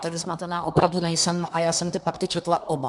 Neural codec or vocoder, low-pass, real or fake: codec, 24 kHz, 1 kbps, SNAC; 10.8 kHz; fake